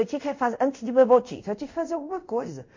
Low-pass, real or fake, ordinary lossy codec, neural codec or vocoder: 7.2 kHz; fake; MP3, 48 kbps; codec, 24 kHz, 0.5 kbps, DualCodec